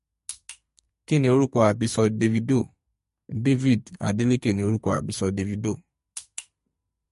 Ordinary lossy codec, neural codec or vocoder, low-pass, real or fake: MP3, 48 kbps; codec, 44.1 kHz, 2.6 kbps, SNAC; 14.4 kHz; fake